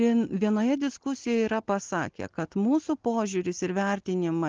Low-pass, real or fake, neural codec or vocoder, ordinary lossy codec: 7.2 kHz; real; none; Opus, 16 kbps